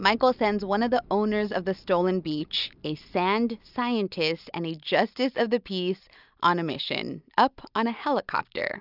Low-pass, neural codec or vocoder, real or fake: 5.4 kHz; none; real